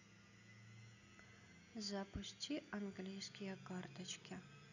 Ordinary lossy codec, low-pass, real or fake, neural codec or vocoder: none; 7.2 kHz; real; none